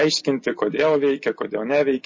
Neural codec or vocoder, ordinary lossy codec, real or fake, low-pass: none; MP3, 32 kbps; real; 7.2 kHz